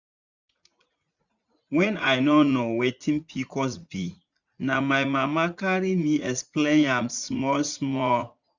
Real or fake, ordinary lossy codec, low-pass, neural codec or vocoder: fake; none; 7.2 kHz; vocoder, 44.1 kHz, 80 mel bands, Vocos